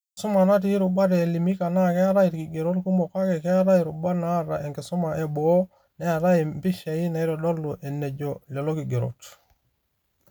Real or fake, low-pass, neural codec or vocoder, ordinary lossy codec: real; none; none; none